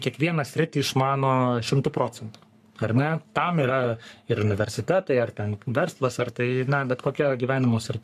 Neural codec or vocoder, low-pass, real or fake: codec, 44.1 kHz, 3.4 kbps, Pupu-Codec; 14.4 kHz; fake